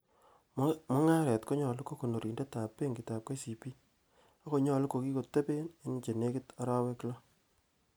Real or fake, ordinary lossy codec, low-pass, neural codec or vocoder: real; none; none; none